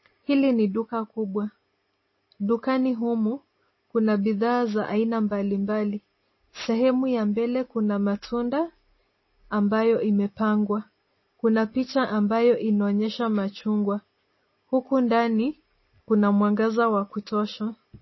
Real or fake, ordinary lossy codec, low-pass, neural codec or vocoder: real; MP3, 24 kbps; 7.2 kHz; none